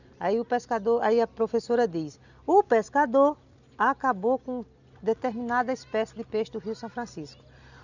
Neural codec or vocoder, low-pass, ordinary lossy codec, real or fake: none; 7.2 kHz; none; real